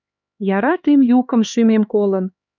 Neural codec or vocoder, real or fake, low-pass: codec, 16 kHz, 4 kbps, X-Codec, HuBERT features, trained on LibriSpeech; fake; 7.2 kHz